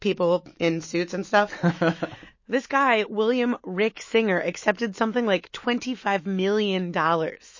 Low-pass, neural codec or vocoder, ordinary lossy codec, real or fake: 7.2 kHz; autoencoder, 48 kHz, 128 numbers a frame, DAC-VAE, trained on Japanese speech; MP3, 32 kbps; fake